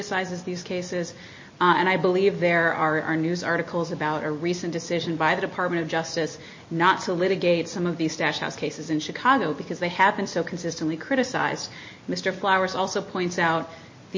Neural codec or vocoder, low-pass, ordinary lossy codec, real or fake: none; 7.2 kHz; MP3, 32 kbps; real